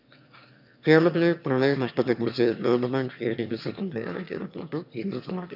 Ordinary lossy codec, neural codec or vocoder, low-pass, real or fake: none; autoencoder, 22.05 kHz, a latent of 192 numbers a frame, VITS, trained on one speaker; 5.4 kHz; fake